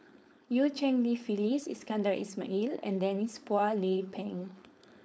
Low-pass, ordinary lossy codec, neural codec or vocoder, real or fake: none; none; codec, 16 kHz, 4.8 kbps, FACodec; fake